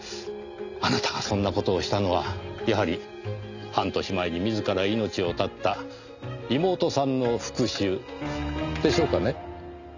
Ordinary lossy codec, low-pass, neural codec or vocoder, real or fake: none; 7.2 kHz; none; real